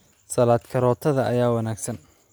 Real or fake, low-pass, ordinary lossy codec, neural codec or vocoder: real; none; none; none